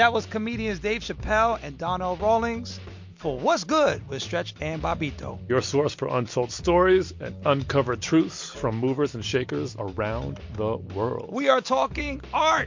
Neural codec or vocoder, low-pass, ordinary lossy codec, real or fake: none; 7.2 kHz; MP3, 48 kbps; real